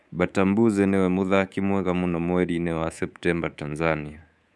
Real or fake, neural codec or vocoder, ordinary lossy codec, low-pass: fake; codec, 24 kHz, 3.1 kbps, DualCodec; none; none